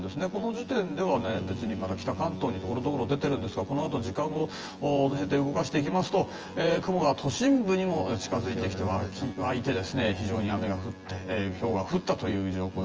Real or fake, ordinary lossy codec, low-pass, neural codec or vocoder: fake; Opus, 24 kbps; 7.2 kHz; vocoder, 24 kHz, 100 mel bands, Vocos